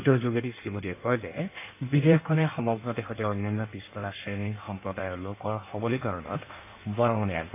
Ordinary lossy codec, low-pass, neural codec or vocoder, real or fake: AAC, 24 kbps; 3.6 kHz; codec, 16 kHz in and 24 kHz out, 1.1 kbps, FireRedTTS-2 codec; fake